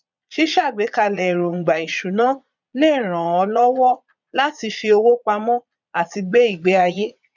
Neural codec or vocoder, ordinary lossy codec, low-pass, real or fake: vocoder, 22.05 kHz, 80 mel bands, Vocos; none; 7.2 kHz; fake